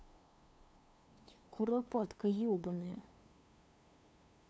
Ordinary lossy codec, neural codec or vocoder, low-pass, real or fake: none; codec, 16 kHz, 2 kbps, FunCodec, trained on LibriTTS, 25 frames a second; none; fake